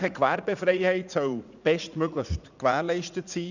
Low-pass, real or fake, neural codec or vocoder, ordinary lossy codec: 7.2 kHz; fake; vocoder, 44.1 kHz, 80 mel bands, Vocos; none